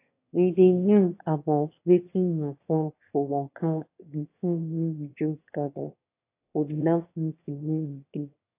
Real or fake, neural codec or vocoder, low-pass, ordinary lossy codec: fake; autoencoder, 22.05 kHz, a latent of 192 numbers a frame, VITS, trained on one speaker; 3.6 kHz; AAC, 24 kbps